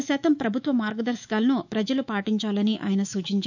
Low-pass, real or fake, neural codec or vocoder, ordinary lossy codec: 7.2 kHz; fake; autoencoder, 48 kHz, 128 numbers a frame, DAC-VAE, trained on Japanese speech; none